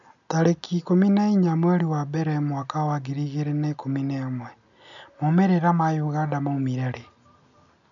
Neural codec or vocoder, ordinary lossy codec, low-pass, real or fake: none; none; 7.2 kHz; real